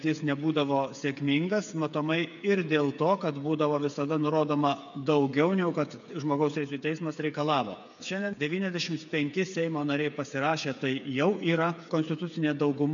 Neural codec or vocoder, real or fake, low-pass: codec, 16 kHz, 8 kbps, FreqCodec, smaller model; fake; 7.2 kHz